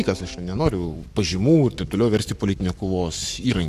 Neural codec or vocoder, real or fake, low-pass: codec, 44.1 kHz, 7.8 kbps, Pupu-Codec; fake; 14.4 kHz